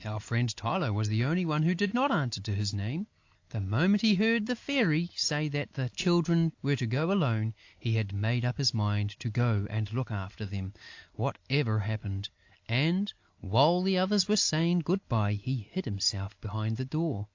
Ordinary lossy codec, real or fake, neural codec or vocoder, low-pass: AAC, 48 kbps; real; none; 7.2 kHz